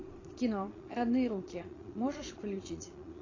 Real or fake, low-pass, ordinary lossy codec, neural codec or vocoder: fake; 7.2 kHz; AAC, 32 kbps; vocoder, 22.05 kHz, 80 mel bands, Vocos